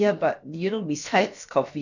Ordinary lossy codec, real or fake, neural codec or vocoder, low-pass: none; fake; codec, 16 kHz, about 1 kbps, DyCAST, with the encoder's durations; 7.2 kHz